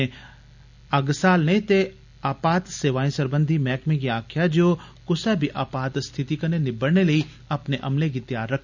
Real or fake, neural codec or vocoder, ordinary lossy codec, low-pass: real; none; none; 7.2 kHz